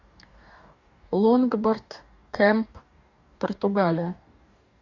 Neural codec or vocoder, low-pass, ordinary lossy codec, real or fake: codec, 44.1 kHz, 2.6 kbps, DAC; 7.2 kHz; Opus, 64 kbps; fake